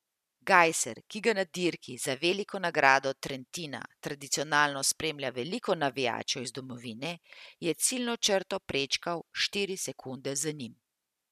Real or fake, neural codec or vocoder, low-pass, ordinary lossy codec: real; none; 14.4 kHz; MP3, 96 kbps